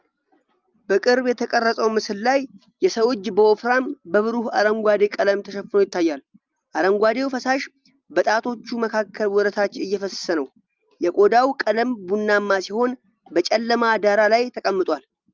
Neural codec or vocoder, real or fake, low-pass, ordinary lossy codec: none; real; 7.2 kHz; Opus, 24 kbps